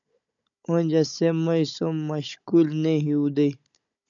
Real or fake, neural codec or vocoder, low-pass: fake; codec, 16 kHz, 16 kbps, FunCodec, trained on Chinese and English, 50 frames a second; 7.2 kHz